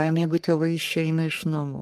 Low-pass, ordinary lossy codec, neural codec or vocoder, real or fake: 14.4 kHz; Opus, 32 kbps; codec, 44.1 kHz, 3.4 kbps, Pupu-Codec; fake